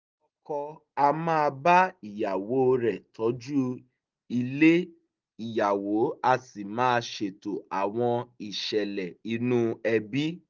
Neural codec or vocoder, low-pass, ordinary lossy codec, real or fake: none; 7.2 kHz; Opus, 32 kbps; real